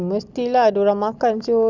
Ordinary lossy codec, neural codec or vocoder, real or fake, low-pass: Opus, 64 kbps; none; real; 7.2 kHz